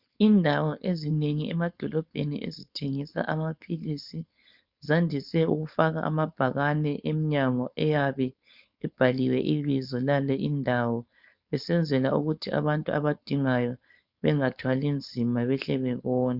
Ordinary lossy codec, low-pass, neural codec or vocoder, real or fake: Opus, 64 kbps; 5.4 kHz; codec, 16 kHz, 4.8 kbps, FACodec; fake